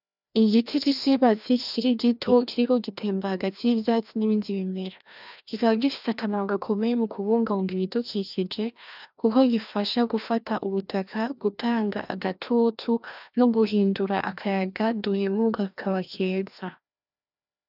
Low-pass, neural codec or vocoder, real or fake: 5.4 kHz; codec, 16 kHz, 1 kbps, FreqCodec, larger model; fake